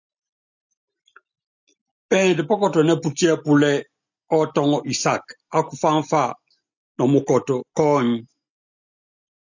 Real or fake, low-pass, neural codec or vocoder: real; 7.2 kHz; none